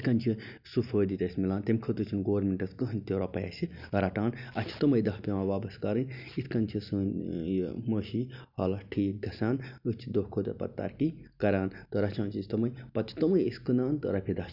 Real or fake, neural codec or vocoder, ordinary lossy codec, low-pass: real; none; none; 5.4 kHz